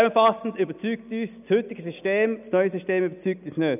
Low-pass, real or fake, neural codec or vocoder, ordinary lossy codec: 3.6 kHz; real; none; none